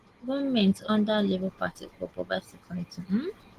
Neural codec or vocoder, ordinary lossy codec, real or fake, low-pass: none; Opus, 16 kbps; real; 14.4 kHz